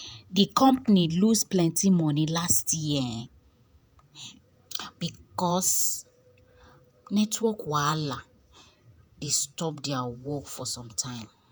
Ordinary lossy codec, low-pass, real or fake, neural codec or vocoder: none; none; fake; vocoder, 48 kHz, 128 mel bands, Vocos